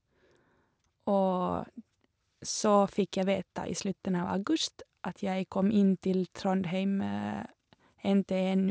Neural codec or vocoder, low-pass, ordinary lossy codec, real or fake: none; none; none; real